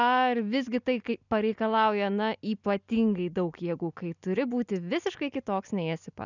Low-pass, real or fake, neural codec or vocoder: 7.2 kHz; real; none